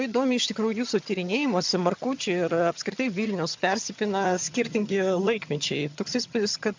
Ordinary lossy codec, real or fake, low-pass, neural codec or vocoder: MP3, 64 kbps; fake; 7.2 kHz; vocoder, 22.05 kHz, 80 mel bands, HiFi-GAN